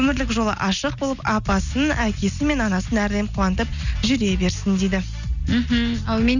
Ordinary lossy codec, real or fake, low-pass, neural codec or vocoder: none; real; 7.2 kHz; none